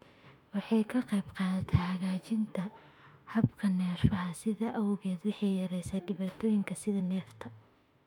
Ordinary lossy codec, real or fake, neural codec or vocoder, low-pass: MP3, 96 kbps; fake; autoencoder, 48 kHz, 32 numbers a frame, DAC-VAE, trained on Japanese speech; 19.8 kHz